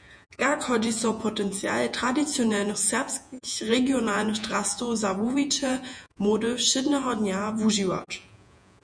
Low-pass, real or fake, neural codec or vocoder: 9.9 kHz; fake; vocoder, 48 kHz, 128 mel bands, Vocos